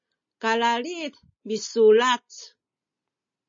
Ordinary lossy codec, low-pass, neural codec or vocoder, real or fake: MP3, 32 kbps; 7.2 kHz; none; real